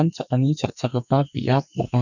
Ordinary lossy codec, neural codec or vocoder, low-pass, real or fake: none; codec, 44.1 kHz, 2.6 kbps, SNAC; 7.2 kHz; fake